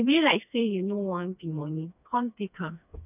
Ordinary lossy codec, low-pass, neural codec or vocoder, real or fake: none; 3.6 kHz; codec, 16 kHz, 2 kbps, FreqCodec, smaller model; fake